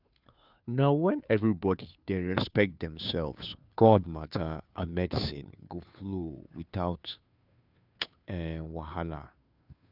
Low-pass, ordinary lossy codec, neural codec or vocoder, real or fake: 5.4 kHz; none; codec, 16 kHz, 2 kbps, FunCodec, trained on Chinese and English, 25 frames a second; fake